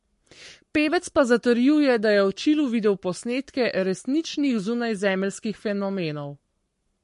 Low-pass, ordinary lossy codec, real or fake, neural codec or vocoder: 14.4 kHz; MP3, 48 kbps; fake; codec, 44.1 kHz, 7.8 kbps, Pupu-Codec